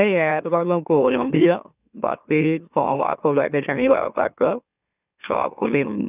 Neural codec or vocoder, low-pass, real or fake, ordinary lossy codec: autoencoder, 44.1 kHz, a latent of 192 numbers a frame, MeloTTS; 3.6 kHz; fake; none